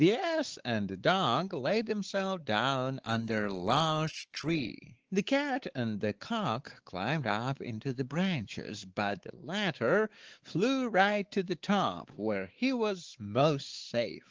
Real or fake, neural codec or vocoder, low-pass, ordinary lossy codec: fake; codec, 16 kHz, 4 kbps, X-Codec, WavLM features, trained on Multilingual LibriSpeech; 7.2 kHz; Opus, 16 kbps